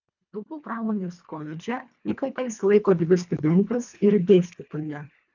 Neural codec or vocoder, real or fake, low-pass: codec, 24 kHz, 1.5 kbps, HILCodec; fake; 7.2 kHz